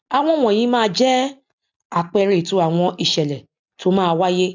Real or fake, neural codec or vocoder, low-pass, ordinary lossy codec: real; none; 7.2 kHz; none